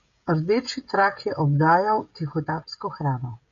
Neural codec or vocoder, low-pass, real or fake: codec, 16 kHz, 16 kbps, FreqCodec, smaller model; 7.2 kHz; fake